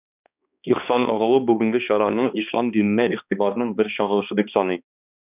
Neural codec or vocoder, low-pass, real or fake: codec, 16 kHz, 2 kbps, X-Codec, HuBERT features, trained on balanced general audio; 3.6 kHz; fake